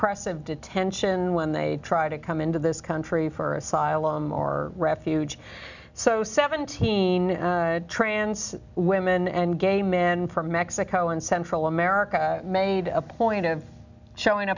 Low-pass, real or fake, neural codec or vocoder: 7.2 kHz; real; none